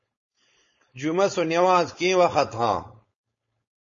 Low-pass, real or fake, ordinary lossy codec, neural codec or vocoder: 7.2 kHz; fake; MP3, 32 kbps; codec, 16 kHz, 4.8 kbps, FACodec